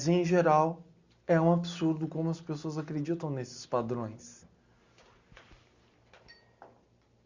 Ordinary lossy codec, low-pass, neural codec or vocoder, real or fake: Opus, 64 kbps; 7.2 kHz; none; real